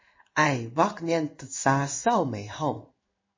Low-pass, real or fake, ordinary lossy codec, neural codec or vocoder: 7.2 kHz; fake; MP3, 32 kbps; codec, 16 kHz in and 24 kHz out, 1 kbps, XY-Tokenizer